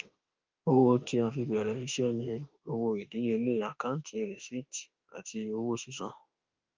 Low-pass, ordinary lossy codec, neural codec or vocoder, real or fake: 7.2 kHz; Opus, 24 kbps; autoencoder, 48 kHz, 32 numbers a frame, DAC-VAE, trained on Japanese speech; fake